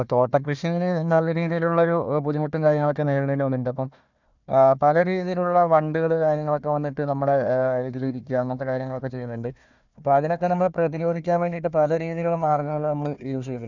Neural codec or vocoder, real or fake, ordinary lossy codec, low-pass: codec, 16 kHz, 1 kbps, FunCodec, trained on Chinese and English, 50 frames a second; fake; none; 7.2 kHz